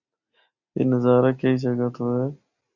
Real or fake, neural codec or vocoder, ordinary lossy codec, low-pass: real; none; Opus, 64 kbps; 7.2 kHz